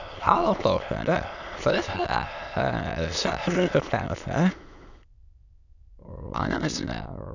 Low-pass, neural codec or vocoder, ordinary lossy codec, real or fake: 7.2 kHz; autoencoder, 22.05 kHz, a latent of 192 numbers a frame, VITS, trained on many speakers; none; fake